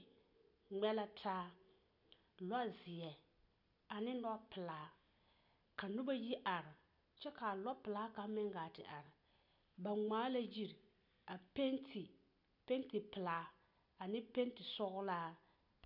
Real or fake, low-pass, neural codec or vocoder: real; 5.4 kHz; none